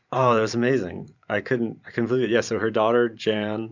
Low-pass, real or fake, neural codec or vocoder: 7.2 kHz; fake; vocoder, 44.1 kHz, 128 mel bands every 512 samples, BigVGAN v2